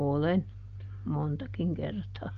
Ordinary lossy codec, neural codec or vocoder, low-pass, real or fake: Opus, 24 kbps; none; 7.2 kHz; real